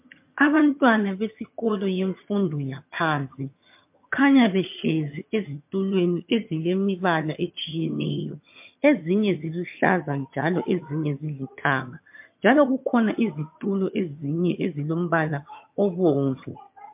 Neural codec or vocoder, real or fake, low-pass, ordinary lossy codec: vocoder, 22.05 kHz, 80 mel bands, HiFi-GAN; fake; 3.6 kHz; MP3, 32 kbps